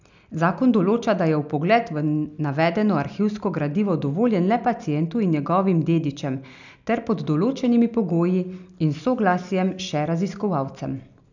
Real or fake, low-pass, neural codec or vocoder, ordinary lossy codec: real; 7.2 kHz; none; none